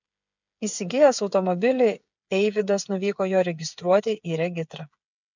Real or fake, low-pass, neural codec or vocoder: fake; 7.2 kHz; codec, 16 kHz, 8 kbps, FreqCodec, smaller model